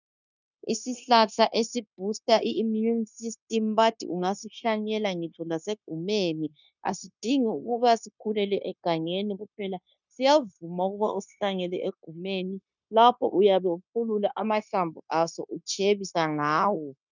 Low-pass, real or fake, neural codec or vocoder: 7.2 kHz; fake; codec, 16 kHz, 0.9 kbps, LongCat-Audio-Codec